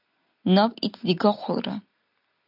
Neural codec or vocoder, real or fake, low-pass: none; real; 5.4 kHz